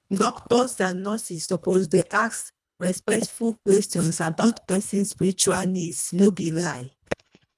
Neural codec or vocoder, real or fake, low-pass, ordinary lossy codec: codec, 24 kHz, 1.5 kbps, HILCodec; fake; none; none